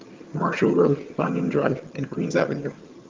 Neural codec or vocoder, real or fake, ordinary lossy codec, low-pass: vocoder, 22.05 kHz, 80 mel bands, HiFi-GAN; fake; Opus, 32 kbps; 7.2 kHz